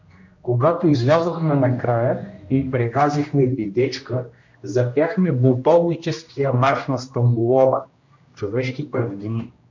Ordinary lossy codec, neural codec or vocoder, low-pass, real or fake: MP3, 48 kbps; codec, 16 kHz, 1 kbps, X-Codec, HuBERT features, trained on general audio; 7.2 kHz; fake